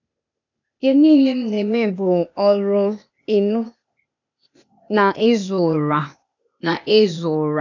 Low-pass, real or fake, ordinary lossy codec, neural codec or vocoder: 7.2 kHz; fake; none; codec, 16 kHz, 0.8 kbps, ZipCodec